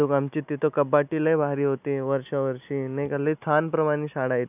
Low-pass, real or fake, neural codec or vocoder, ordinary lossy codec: 3.6 kHz; real; none; none